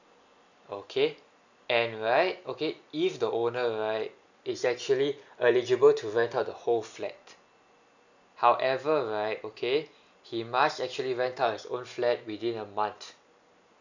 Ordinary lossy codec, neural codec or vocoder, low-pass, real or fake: none; none; 7.2 kHz; real